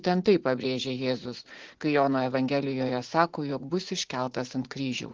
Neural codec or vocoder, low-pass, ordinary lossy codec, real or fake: vocoder, 44.1 kHz, 80 mel bands, Vocos; 7.2 kHz; Opus, 16 kbps; fake